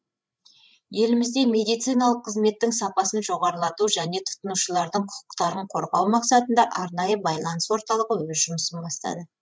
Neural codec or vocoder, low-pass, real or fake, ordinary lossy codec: codec, 16 kHz, 8 kbps, FreqCodec, larger model; none; fake; none